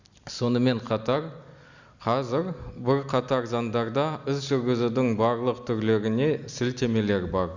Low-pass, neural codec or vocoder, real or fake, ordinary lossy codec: 7.2 kHz; none; real; Opus, 64 kbps